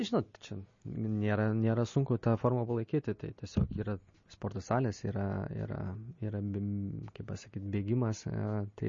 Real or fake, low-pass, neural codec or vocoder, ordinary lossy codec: real; 7.2 kHz; none; MP3, 32 kbps